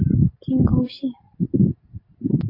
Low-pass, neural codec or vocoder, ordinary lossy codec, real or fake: 5.4 kHz; none; AAC, 32 kbps; real